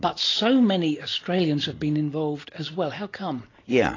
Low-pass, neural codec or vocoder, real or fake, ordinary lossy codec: 7.2 kHz; none; real; AAC, 32 kbps